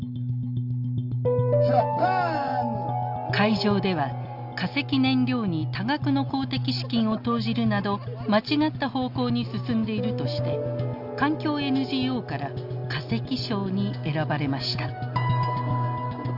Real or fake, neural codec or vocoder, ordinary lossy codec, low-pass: real; none; none; 5.4 kHz